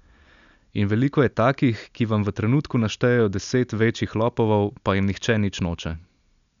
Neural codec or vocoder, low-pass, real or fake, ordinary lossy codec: none; 7.2 kHz; real; none